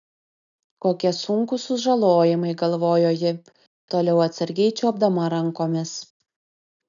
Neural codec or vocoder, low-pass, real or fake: none; 7.2 kHz; real